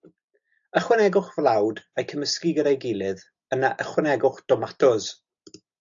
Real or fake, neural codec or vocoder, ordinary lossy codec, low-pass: real; none; AAC, 64 kbps; 7.2 kHz